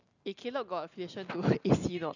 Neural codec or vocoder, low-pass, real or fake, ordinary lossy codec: none; 7.2 kHz; real; none